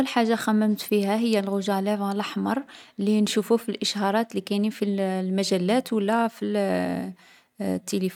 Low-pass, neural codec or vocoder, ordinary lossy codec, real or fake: 19.8 kHz; none; none; real